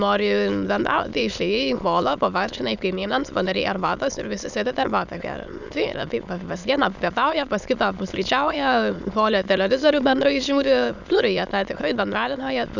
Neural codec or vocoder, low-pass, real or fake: autoencoder, 22.05 kHz, a latent of 192 numbers a frame, VITS, trained on many speakers; 7.2 kHz; fake